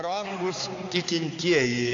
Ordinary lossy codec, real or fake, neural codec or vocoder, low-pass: AAC, 48 kbps; fake; codec, 16 kHz, 4 kbps, X-Codec, HuBERT features, trained on balanced general audio; 7.2 kHz